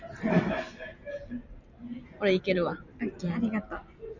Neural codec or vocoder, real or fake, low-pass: none; real; 7.2 kHz